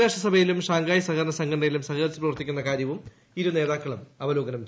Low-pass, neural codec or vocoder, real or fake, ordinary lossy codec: none; none; real; none